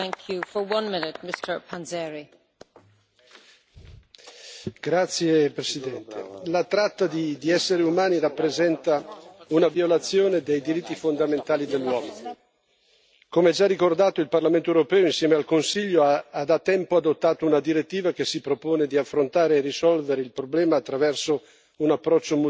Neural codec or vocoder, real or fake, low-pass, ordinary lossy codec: none; real; none; none